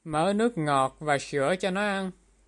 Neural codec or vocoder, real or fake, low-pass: none; real; 10.8 kHz